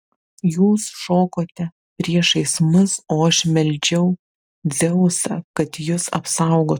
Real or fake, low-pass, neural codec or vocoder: real; 19.8 kHz; none